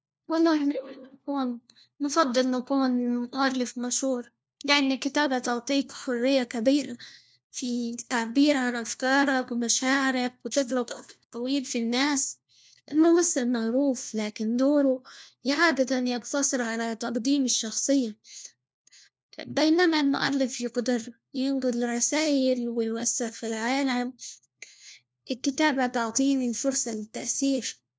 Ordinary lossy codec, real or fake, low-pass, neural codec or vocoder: none; fake; none; codec, 16 kHz, 1 kbps, FunCodec, trained on LibriTTS, 50 frames a second